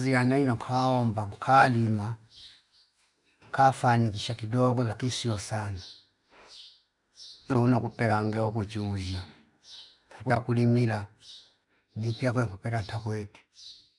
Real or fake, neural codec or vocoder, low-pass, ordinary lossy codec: fake; autoencoder, 48 kHz, 32 numbers a frame, DAC-VAE, trained on Japanese speech; 10.8 kHz; none